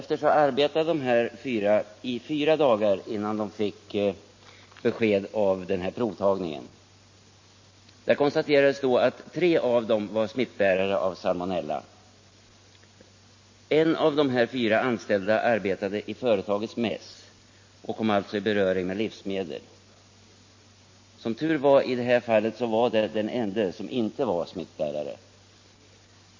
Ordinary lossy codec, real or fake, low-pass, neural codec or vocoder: MP3, 32 kbps; real; 7.2 kHz; none